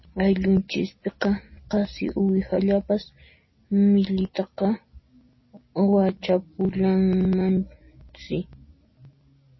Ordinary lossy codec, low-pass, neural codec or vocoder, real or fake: MP3, 24 kbps; 7.2 kHz; none; real